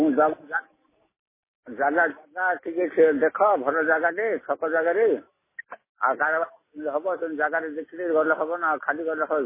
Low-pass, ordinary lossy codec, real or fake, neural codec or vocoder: 3.6 kHz; MP3, 16 kbps; real; none